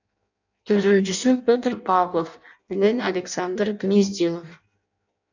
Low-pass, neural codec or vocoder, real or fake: 7.2 kHz; codec, 16 kHz in and 24 kHz out, 0.6 kbps, FireRedTTS-2 codec; fake